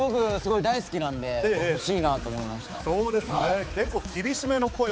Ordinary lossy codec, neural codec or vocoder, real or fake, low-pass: none; codec, 16 kHz, 4 kbps, X-Codec, HuBERT features, trained on balanced general audio; fake; none